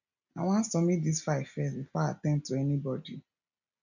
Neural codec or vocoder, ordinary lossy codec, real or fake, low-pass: none; none; real; 7.2 kHz